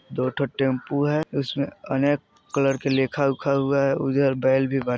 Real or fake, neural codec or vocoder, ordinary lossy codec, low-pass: real; none; none; none